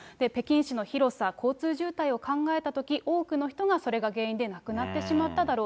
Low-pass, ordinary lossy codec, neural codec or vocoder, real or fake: none; none; none; real